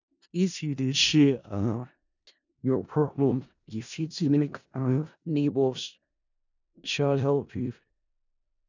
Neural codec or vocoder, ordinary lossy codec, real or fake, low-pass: codec, 16 kHz in and 24 kHz out, 0.4 kbps, LongCat-Audio-Codec, four codebook decoder; none; fake; 7.2 kHz